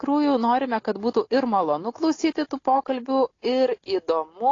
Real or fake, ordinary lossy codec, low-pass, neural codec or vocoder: real; AAC, 32 kbps; 7.2 kHz; none